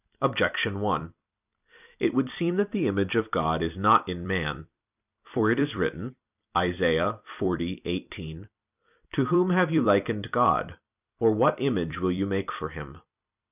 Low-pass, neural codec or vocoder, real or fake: 3.6 kHz; vocoder, 44.1 kHz, 128 mel bands every 256 samples, BigVGAN v2; fake